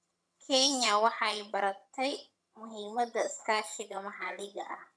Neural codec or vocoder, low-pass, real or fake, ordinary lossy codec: vocoder, 22.05 kHz, 80 mel bands, HiFi-GAN; none; fake; none